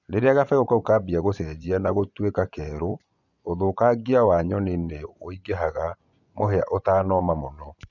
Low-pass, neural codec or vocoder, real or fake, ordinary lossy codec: 7.2 kHz; vocoder, 24 kHz, 100 mel bands, Vocos; fake; none